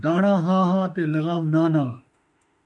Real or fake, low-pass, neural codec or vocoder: fake; 10.8 kHz; autoencoder, 48 kHz, 32 numbers a frame, DAC-VAE, trained on Japanese speech